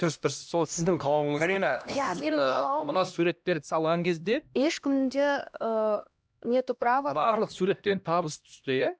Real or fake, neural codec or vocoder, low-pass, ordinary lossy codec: fake; codec, 16 kHz, 1 kbps, X-Codec, HuBERT features, trained on LibriSpeech; none; none